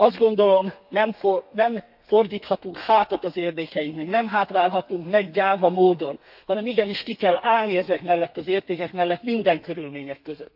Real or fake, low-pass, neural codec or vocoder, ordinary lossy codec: fake; 5.4 kHz; codec, 32 kHz, 1.9 kbps, SNAC; AAC, 48 kbps